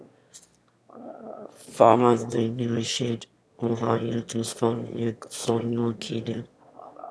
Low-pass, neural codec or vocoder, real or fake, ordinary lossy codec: none; autoencoder, 22.05 kHz, a latent of 192 numbers a frame, VITS, trained on one speaker; fake; none